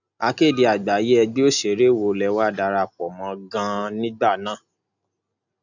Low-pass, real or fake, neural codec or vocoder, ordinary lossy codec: 7.2 kHz; real; none; none